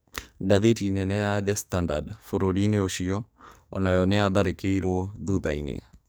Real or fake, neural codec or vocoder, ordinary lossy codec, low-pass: fake; codec, 44.1 kHz, 2.6 kbps, SNAC; none; none